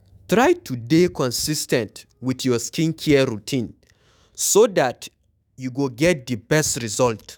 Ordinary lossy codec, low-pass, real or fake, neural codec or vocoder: none; none; fake; autoencoder, 48 kHz, 128 numbers a frame, DAC-VAE, trained on Japanese speech